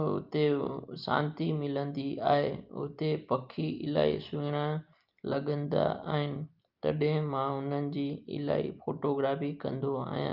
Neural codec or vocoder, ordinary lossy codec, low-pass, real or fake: none; Opus, 24 kbps; 5.4 kHz; real